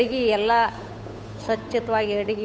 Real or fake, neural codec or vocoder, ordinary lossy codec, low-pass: fake; codec, 16 kHz, 8 kbps, FunCodec, trained on Chinese and English, 25 frames a second; none; none